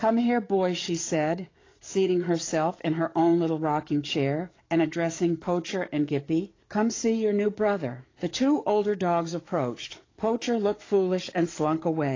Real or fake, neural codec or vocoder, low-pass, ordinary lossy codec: fake; codec, 44.1 kHz, 7.8 kbps, DAC; 7.2 kHz; AAC, 32 kbps